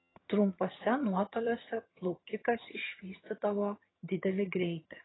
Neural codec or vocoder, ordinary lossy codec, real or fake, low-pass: vocoder, 22.05 kHz, 80 mel bands, HiFi-GAN; AAC, 16 kbps; fake; 7.2 kHz